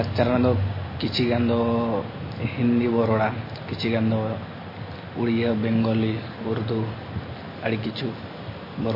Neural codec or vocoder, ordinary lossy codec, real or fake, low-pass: none; MP3, 24 kbps; real; 5.4 kHz